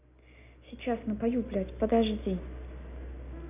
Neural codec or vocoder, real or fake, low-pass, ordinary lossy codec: none; real; 3.6 kHz; none